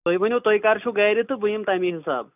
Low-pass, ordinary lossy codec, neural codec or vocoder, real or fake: 3.6 kHz; none; none; real